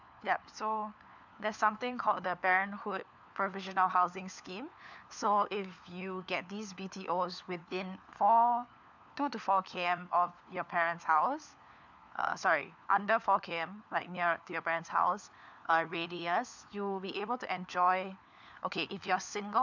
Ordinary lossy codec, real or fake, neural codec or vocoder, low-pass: none; fake; codec, 16 kHz, 4 kbps, FunCodec, trained on LibriTTS, 50 frames a second; 7.2 kHz